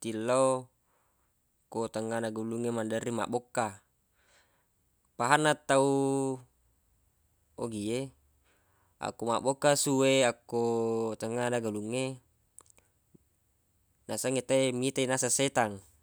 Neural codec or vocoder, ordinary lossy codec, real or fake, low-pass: none; none; real; none